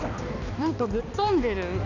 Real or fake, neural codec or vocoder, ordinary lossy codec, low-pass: fake; codec, 16 kHz, 2 kbps, X-Codec, HuBERT features, trained on balanced general audio; none; 7.2 kHz